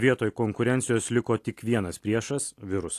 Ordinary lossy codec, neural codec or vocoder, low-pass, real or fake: AAC, 64 kbps; none; 14.4 kHz; real